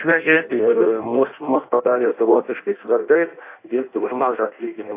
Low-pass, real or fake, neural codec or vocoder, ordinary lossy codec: 3.6 kHz; fake; codec, 16 kHz in and 24 kHz out, 0.6 kbps, FireRedTTS-2 codec; AAC, 24 kbps